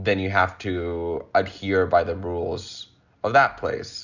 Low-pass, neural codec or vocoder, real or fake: 7.2 kHz; none; real